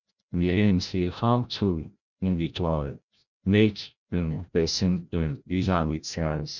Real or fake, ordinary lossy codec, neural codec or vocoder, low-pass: fake; none; codec, 16 kHz, 0.5 kbps, FreqCodec, larger model; 7.2 kHz